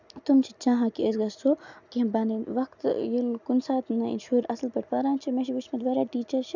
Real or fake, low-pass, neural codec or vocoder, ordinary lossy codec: real; 7.2 kHz; none; none